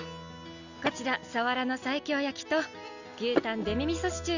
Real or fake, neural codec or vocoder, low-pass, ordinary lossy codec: real; none; 7.2 kHz; none